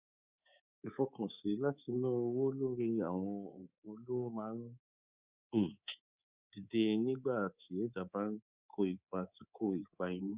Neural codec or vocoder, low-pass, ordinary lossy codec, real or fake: codec, 24 kHz, 3.1 kbps, DualCodec; 3.6 kHz; Opus, 64 kbps; fake